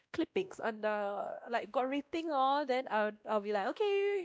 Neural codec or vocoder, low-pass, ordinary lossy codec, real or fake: codec, 16 kHz, 1 kbps, X-Codec, WavLM features, trained on Multilingual LibriSpeech; none; none; fake